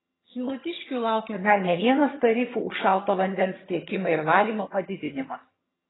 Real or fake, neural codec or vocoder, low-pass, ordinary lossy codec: fake; vocoder, 22.05 kHz, 80 mel bands, HiFi-GAN; 7.2 kHz; AAC, 16 kbps